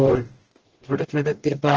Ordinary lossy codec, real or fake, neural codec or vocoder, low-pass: Opus, 16 kbps; fake; codec, 44.1 kHz, 0.9 kbps, DAC; 7.2 kHz